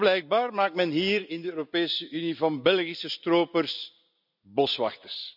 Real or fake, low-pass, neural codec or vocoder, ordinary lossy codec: real; 5.4 kHz; none; none